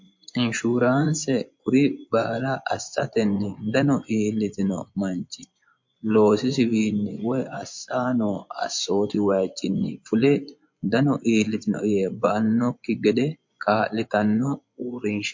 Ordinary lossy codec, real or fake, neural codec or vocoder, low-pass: MP3, 48 kbps; fake; vocoder, 24 kHz, 100 mel bands, Vocos; 7.2 kHz